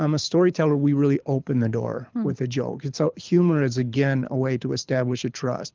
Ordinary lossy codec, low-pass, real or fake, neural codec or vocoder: Opus, 24 kbps; 7.2 kHz; fake; codec, 24 kHz, 6 kbps, HILCodec